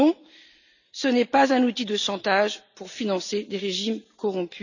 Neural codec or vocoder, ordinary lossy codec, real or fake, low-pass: none; none; real; 7.2 kHz